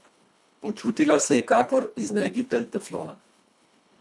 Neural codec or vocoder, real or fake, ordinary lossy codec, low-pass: codec, 24 kHz, 1.5 kbps, HILCodec; fake; none; none